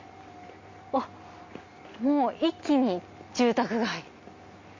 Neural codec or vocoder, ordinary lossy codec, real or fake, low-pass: none; MP3, 32 kbps; real; 7.2 kHz